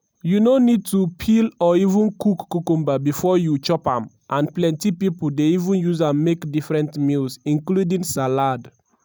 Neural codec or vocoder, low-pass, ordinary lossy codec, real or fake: none; none; none; real